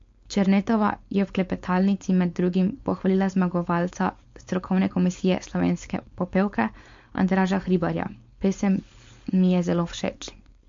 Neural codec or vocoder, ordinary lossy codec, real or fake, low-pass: codec, 16 kHz, 4.8 kbps, FACodec; MP3, 48 kbps; fake; 7.2 kHz